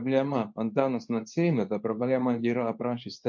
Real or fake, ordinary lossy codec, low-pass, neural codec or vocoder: fake; MP3, 48 kbps; 7.2 kHz; codec, 24 kHz, 0.9 kbps, WavTokenizer, medium speech release version 2